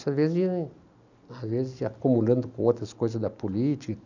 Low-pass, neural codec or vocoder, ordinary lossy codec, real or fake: 7.2 kHz; none; none; real